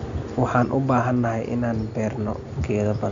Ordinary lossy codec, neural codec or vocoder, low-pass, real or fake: MP3, 64 kbps; none; 7.2 kHz; real